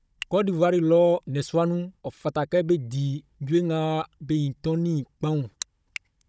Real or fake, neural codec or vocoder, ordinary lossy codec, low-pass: fake; codec, 16 kHz, 16 kbps, FunCodec, trained on Chinese and English, 50 frames a second; none; none